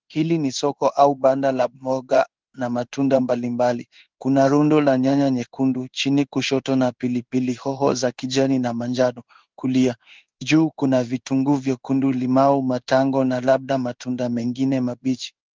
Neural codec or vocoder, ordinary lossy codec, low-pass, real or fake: codec, 16 kHz in and 24 kHz out, 1 kbps, XY-Tokenizer; Opus, 24 kbps; 7.2 kHz; fake